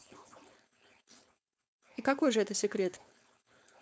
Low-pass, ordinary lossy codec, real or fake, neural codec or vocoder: none; none; fake; codec, 16 kHz, 4.8 kbps, FACodec